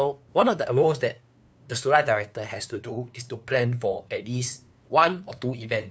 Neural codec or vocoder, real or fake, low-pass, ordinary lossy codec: codec, 16 kHz, 2 kbps, FunCodec, trained on LibriTTS, 25 frames a second; fake; none; none